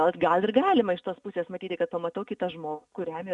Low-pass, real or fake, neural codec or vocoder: 10.8 kHz; real; none